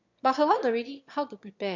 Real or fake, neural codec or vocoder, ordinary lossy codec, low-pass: fake; autoencoder, 22.05 kHz, a latent of 192 numbers a frame, VITS, trained on one speaker; MP3, 48 kbps; 7.2 kHz